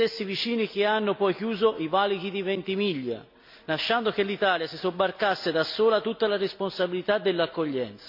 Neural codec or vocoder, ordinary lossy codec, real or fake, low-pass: none; MP3, 48 kbps; real; 5.4 kHz